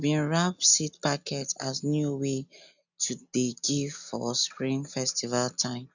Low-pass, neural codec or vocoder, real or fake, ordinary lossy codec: 7.2 kHz; none; real; none